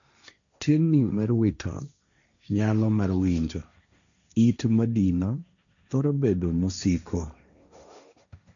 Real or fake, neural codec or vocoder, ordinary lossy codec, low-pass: fake; codec, 16 kHz, 1.1 kbps, Voila-Tokenizer; none; 7.2 kHz